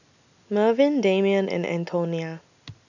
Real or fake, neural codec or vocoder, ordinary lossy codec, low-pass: real; none; none; 7.2 kHz